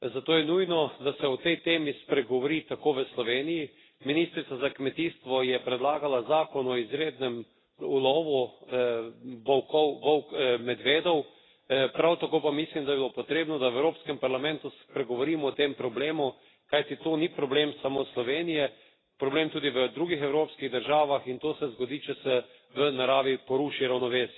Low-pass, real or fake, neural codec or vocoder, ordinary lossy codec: 7.2 kHz; real; none; AAC, 16 kbps